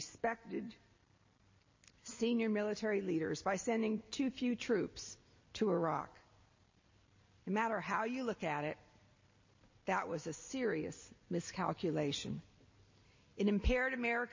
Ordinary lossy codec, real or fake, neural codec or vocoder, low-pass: MP3, 32 kbps; real; none; 7.2 kHz